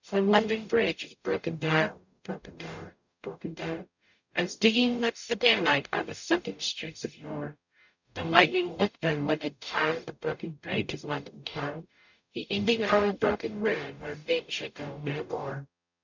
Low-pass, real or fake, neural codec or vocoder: 7.2 kHz; fake; codec, 44.1 kHz, 0.9 kbps, DAC